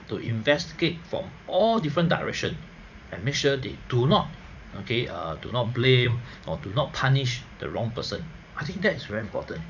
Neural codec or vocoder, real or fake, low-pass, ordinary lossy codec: vocoder, 44.1 kHz, 80 mel bands, Vocos; fake; 7.2 kHz; none